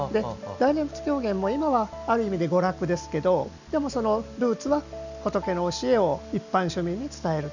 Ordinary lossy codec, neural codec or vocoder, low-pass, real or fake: none; none; 7.2 kHz; real